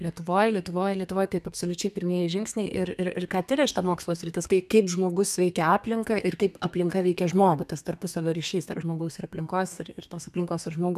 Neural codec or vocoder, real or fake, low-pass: codec, 32 kHz, 1.9 kbps, SNAC; fake; 14.4 kHz